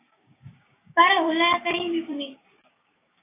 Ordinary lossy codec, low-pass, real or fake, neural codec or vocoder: AAC, 16 kbps; 3.6 kHz; fake; codec, 16 kHz, 6 kbps, DAC